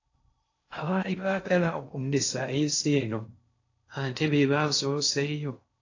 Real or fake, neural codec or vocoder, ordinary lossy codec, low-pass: fake; codec, 16 kHz in and 24 kHz out, 0.6 kbps, FocalCodec, streaming, 4096 codes; AAC, 48 kbps; 7.2 kHz